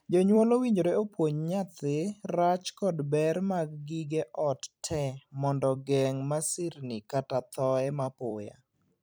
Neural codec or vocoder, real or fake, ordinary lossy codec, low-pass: vocoder, 44.1 kHz, 128 mel bands every 256 samples, BigVGAN v2; fake; none; none